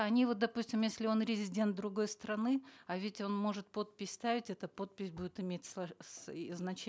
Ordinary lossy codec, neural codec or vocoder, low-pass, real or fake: none; none; none; real